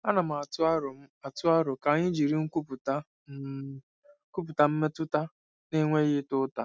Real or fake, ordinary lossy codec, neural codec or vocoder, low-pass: real; none; none; none